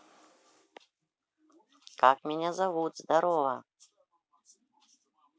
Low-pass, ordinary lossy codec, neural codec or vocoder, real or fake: none; none; none; real